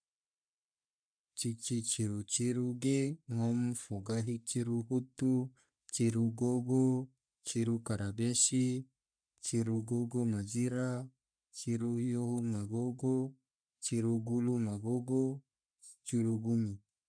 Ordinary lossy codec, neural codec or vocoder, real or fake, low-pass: none; codec, 44.1 kHz, 3.4 kbps, Pupu-Codec; fake; 9.9 kHz